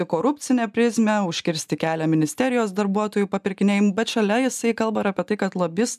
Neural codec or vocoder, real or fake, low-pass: none; real; 14.4 kHz